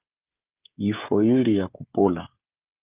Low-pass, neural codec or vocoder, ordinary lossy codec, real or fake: 3.6 kHz; codec, 16 kHz, 16 kbps, FreqCodec, smaller model; Opus, 32 kbps; fake